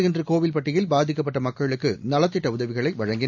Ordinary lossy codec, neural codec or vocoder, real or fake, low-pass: none; none; real; 7.2 kHz